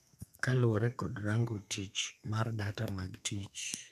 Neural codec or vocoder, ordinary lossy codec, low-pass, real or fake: codec, 32 kHz, 1.9 kbps, SNAC; none; 14.4 kHz; fake